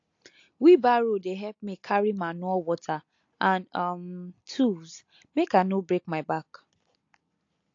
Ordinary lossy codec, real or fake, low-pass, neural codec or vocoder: AAC, 48 kbps; real; 7.2 kHz; none